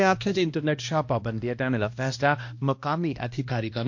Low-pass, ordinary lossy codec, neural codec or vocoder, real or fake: 7.2 kHz; MP3, 48 kbps; codec, 16 kHz, 1 kbps, X-Codec, HuBERT features, trained on balanced general audio; fake